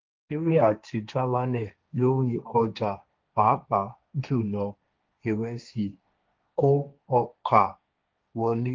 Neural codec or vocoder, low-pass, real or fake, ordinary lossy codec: codec, 16 kHz, 1.1 kbps, Voila-Tokenizer; 7.2 kHz; fake; Opus, 24 kbps